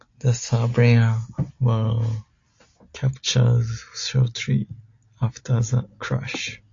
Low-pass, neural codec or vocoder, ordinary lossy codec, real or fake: 7.2 kHz; none; AAC, 48 kbps; real